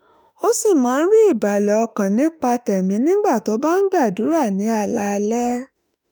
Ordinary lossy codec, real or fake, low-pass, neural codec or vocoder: none; fake; none; autoencoder, 48 kHz, 32 numbers a frame, DAC-VAE, trained on Japanese speech